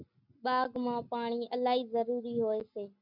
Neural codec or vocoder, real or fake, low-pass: none; real; 5.4 kHz